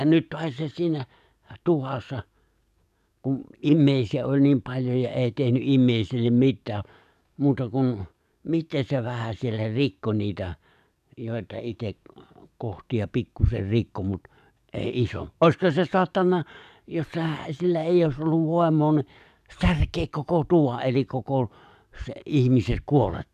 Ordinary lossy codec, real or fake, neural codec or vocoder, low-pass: none; fake; codec, 44.1 kHz, 7.8 kbps, DAC; 14.4 kHz